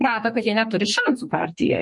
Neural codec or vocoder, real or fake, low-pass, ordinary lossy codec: codec, 32 kHz, 1.9 kbps, SNAC; fake; 10.8 kHz; MP3, 48 kbps